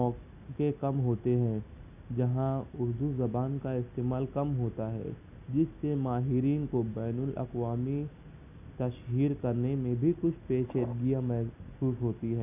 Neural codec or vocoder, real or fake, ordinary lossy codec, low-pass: none; real; none; 3.6 kHz